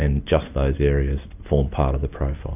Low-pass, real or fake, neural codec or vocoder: 3.6 kHz; real; none